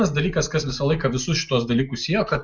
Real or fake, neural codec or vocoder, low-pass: real; none; 7.2 kHz